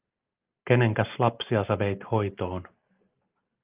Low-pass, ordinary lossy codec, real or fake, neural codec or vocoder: 3.6 kHz; Opus, 32 kbps; real; none